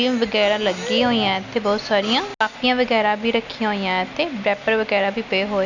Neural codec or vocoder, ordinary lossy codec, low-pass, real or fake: none; none; 7.2 kHz; real